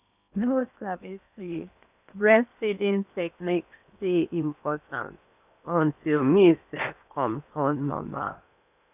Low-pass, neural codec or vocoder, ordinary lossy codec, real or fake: 3.6 kHz; codec, 16 kHz in and 24 kHz out, 0.8 kbps, FocalCodec, streaming, 65536 codes; none; fake